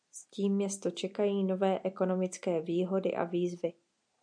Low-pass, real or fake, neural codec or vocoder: 9.9 kHz; real; none